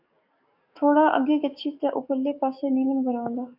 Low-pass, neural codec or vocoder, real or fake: 5.4 kHz; codec, 44.1 kHz, 7.8 kbps, DAC; fake